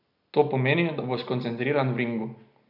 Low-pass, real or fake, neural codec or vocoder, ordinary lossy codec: 5.4 kHz; real; none; none